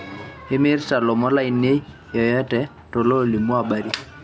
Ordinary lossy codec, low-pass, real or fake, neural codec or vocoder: none; none; real; none